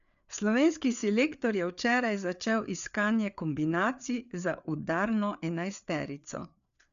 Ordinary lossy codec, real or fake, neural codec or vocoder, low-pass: none; fake; codec, 16 kHz, 4 kbps, FreqCodec, larger model; 7.2 kHz